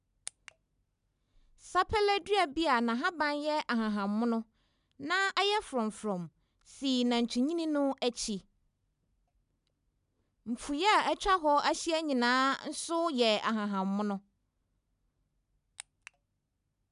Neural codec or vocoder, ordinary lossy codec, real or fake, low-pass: none; none; real; 10.8 kHz